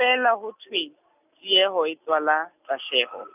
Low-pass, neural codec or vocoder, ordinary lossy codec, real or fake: 3.6 kHz; none; none; real